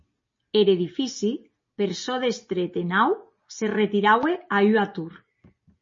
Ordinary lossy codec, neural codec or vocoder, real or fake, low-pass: MP3, 32 kbps; none; real; 7.2 kHz